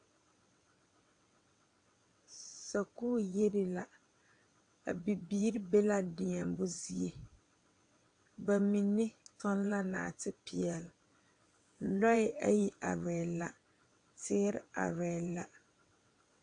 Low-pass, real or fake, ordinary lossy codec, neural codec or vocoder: 9.9 kHz; fake; AAC, 64 kbps; vocoder, 22.05 kHz, 80 mel bands, WaveNeXt